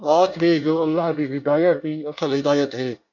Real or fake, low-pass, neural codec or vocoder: fake; 7.2 kHz; codec, 24 kHz, 1 kbps, SNAC